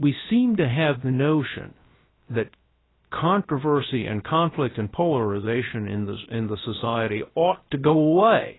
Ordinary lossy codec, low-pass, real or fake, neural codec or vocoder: AAC, 16 kbps; 7.2 kHz; fake; codec, 16 kHz, about 1 kbps, DyCAST, with the encoder's durations